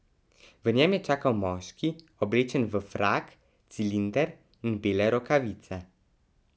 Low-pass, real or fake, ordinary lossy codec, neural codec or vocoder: none; real; none; none